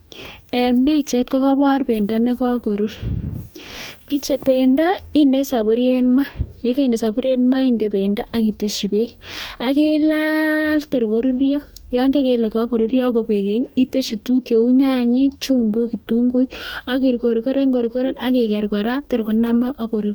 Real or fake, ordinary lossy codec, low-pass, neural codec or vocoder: fake; none; none; codec, 44.1 kHz, 2.6 kbps, DAC